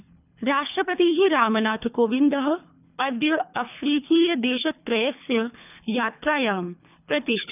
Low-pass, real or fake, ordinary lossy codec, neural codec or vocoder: 3.6 kHz; fake; none; codec, 24 kHz, 3 kbps, HILCodec